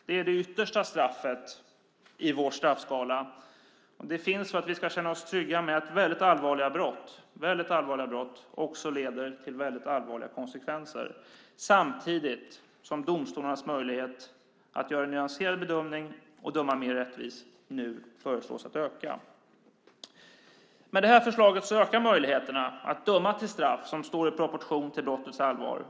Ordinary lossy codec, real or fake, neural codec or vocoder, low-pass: none; real; none; none